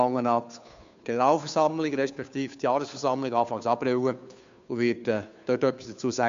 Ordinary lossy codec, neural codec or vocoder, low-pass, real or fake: MP3, 64 kbps; codec, 16 kHz, 2 kbps, FunCodec, trained on Chinese and English, 25 frames a second; 7.2 kHz; fake